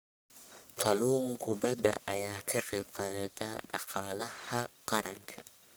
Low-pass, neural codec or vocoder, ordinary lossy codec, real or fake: none; codec, 44.1 kHz, 1.7 kbps, Pupu-Codec; none; fake